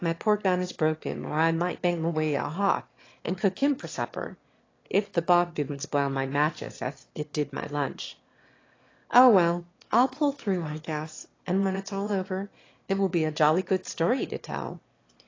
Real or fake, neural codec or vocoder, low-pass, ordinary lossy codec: fake; autoencoder, 22.05 kHz, a latent of 192 numbers a frame, VITS, trained on one speaker; 7.2 kHz; AAC, 32 kbps